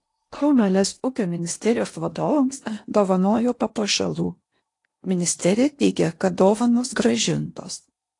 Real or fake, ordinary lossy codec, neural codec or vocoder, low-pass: fake; AAC, 48 kbps; codec, 16 kHz in and 24 kHz out, 0.8 kbps, FocalCodec, streaming, 65536 codes; 10.8 kHz